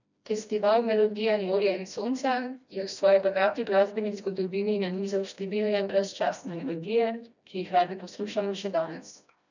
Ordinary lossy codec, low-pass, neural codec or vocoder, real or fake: none; 7.2 kHz; codec, 16 kHz, 1 kbps, FreqCodec, smaller model; fake